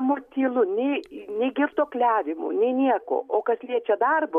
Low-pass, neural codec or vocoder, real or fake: 14.4 kHz; none; real